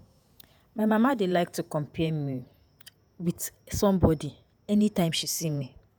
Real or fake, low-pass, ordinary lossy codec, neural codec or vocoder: fake; none; none; vocoder, 48 kHz, 128 mel bands, Vocos